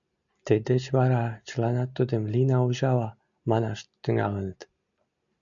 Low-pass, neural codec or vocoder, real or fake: 7.2 kHz; none; real